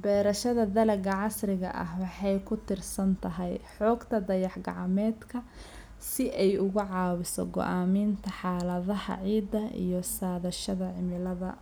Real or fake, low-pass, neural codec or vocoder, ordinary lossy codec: real; none; none; none